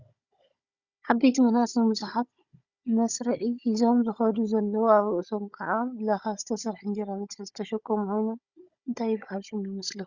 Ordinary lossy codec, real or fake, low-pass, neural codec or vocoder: Opus, 64 kbps; fake; 7.2 kHz; codec, 16 kHz, 16 kbps, FunCodec, trained on Chinese and English, 50 frames a second